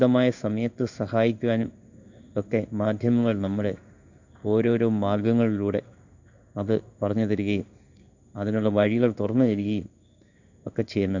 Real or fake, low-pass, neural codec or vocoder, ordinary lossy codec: fake; 7.2 kHz; codec, 16 kHz in and 24 kHz out, 1 kbps, XY-Tokenizer; none